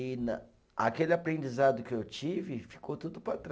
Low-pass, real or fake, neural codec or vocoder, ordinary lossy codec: none; real; none; none